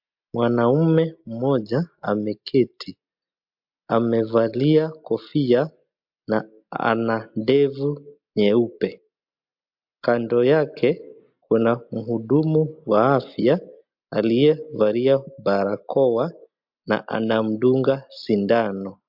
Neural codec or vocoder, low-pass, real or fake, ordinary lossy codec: none; 5.4 kHz; real; MP3, 48 kbps